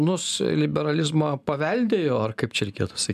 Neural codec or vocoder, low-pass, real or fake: none; 14.4 kHz; real